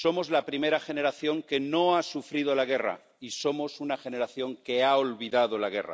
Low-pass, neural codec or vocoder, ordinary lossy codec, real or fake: none; none; none; real